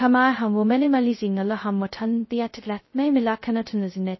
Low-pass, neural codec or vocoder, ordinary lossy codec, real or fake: 7.2 kHz; codec, 16 kHz, 0.2 kbps, FocalCodec; MP3, 24 kbps; fake